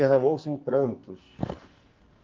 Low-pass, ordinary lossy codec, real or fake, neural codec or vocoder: 7.2 kHz; Opus, 32 kbps; fake; codec, 44.1 kHz, 2.6 kbps, SNAC